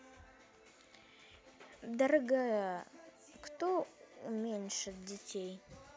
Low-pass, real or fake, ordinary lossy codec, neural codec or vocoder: none; real; none; none